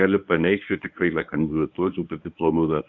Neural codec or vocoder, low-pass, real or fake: codec, 24 kHz, 0.9 kbps, WavTokenizer, medium speech release version 1; 7.2 kHz; fake